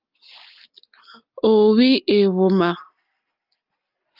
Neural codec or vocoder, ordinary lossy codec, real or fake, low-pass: none; Opus, 24 kbps; real; 5.4 kHz